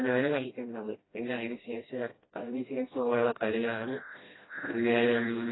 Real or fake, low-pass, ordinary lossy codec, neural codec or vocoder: fake; 7.2 kHz; AAC, 16 kbps; codec, 16 kHz, 1 kbps, FreqCodec, smaller model